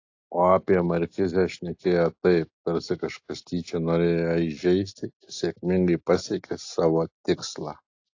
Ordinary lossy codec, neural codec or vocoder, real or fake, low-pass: AAC, 48 kbps; none; real; 7.2 kHz